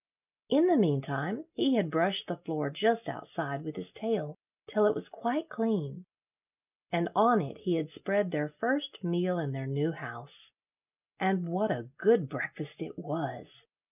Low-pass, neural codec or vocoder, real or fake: 3.6 kHz; none; real